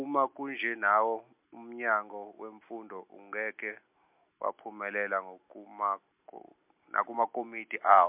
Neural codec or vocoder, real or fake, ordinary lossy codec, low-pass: none; real; MP3, 32 kbps; 3.6 kHz